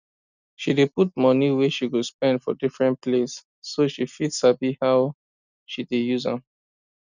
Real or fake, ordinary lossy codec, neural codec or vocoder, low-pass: real; none; none; 7.2 kHz